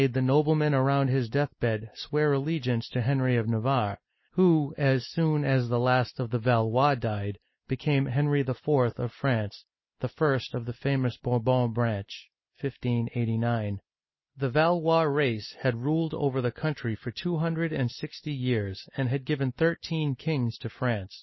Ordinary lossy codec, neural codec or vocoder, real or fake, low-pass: MP3, 24 kbps; none; real; 7.2 kHz